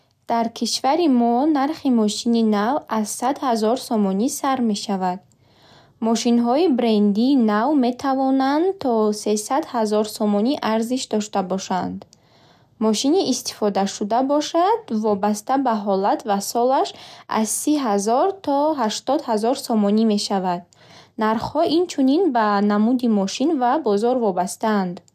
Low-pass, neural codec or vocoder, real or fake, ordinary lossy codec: 14.4 kHz; none; real; none